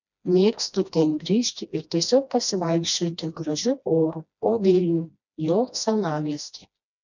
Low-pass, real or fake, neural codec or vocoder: 7.2 kHz; fake; codec, 16 kHz, 1 kbps, FreqCodec, smaller model